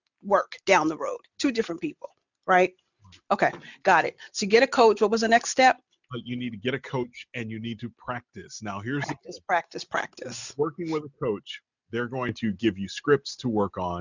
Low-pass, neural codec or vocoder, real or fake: 7.2 kHz; none; real